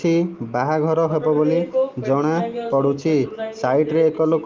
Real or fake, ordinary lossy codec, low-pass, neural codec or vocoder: real; Opus, 24 kbps; 7.2 kHz; none